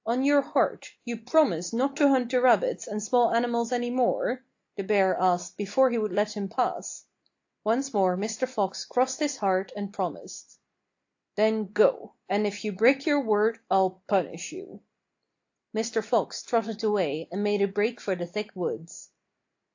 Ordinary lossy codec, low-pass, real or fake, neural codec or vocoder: AAC, 48 kbps; 7.2 kHz; real; none